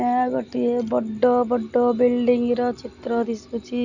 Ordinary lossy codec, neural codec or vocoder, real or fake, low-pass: none; none; real; 7.2 kHz